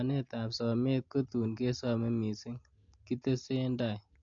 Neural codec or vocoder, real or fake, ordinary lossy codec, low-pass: none; real; MP3, 48 kbps; 7.2 kHz